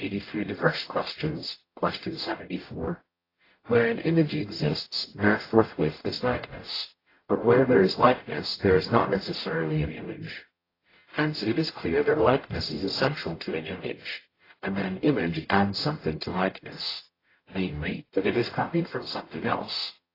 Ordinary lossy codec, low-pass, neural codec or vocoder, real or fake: AAC, 24 kbps; 5.4 kHz; codec, 44.1 kHz, 0.9 kbps, DAC; fake